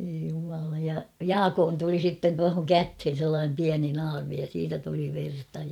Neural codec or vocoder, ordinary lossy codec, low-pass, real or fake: vocoder, 44.1 kHz, 128 mel bands every 512 samples, BigVGAN v2; none; 19.8 kHz; fake